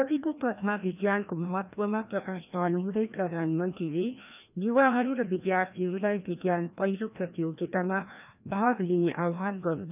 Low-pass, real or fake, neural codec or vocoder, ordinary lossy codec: 3.6 kHz; fake; codec, 16 kHz, 1 kbps, FreqCodec, larger model; none